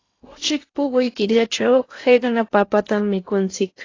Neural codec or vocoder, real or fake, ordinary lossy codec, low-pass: codec, 16 kHz in and 24 kHz out, 0.8 kbps, FocalCodec, streaming, 65536 codes; fake; AAC, 32 kbps; 7.2 kHz